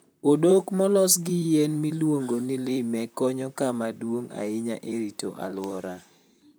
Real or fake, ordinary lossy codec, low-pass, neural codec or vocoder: fake; none; none; vocoder, 44.1 kHz, 128 mel bands, Pupu-Vocoder